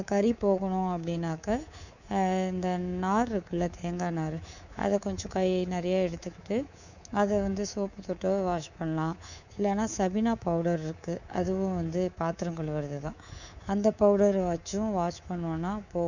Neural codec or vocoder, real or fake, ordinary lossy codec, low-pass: codec, 24 kHz, 3.1 kbps, DualCodec; fake; none; 7.2 kHz